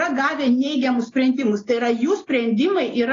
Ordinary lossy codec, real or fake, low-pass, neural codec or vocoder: AAC, 32 kbps; real; 7.2 kHz; none